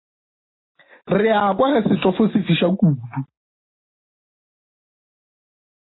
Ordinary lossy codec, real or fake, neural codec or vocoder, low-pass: AAC, 16 kbps; real; none; 7.2 kHz